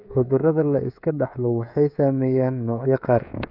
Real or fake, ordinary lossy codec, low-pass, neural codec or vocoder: fake; none; 5.4 kHz; codec, 16 kHz, 16 kbps, FreqCodec, smaller model